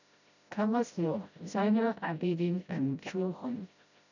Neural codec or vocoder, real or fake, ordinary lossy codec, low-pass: codec, 16 kHz, 0.5 kbps, FreqCodec, smaller model; fake; none; 7.2 kHz